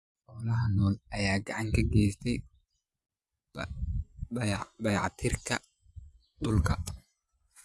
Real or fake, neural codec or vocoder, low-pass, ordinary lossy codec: fake; vocoder, 24 kHz, 100 mel bands, Vocos; none; none